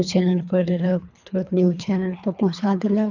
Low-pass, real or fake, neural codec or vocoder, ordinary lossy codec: 7.2 kHz; fake; codec, 24 kHz, 3 kbps, HILCodec; none